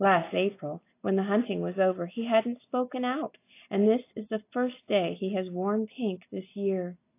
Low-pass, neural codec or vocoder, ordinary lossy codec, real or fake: 3.6 kHz; none; AAC, 24 kbps; real